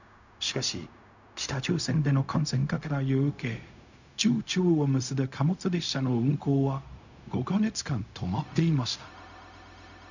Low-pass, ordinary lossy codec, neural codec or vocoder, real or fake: 7.2 kHz; none; codec, 16 kHz, 0.4 kbps, LongCat-Audio-Codec; fake